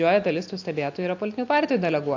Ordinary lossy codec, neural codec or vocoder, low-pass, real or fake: AAC, 48 kbps; none; 7.2 kHz; real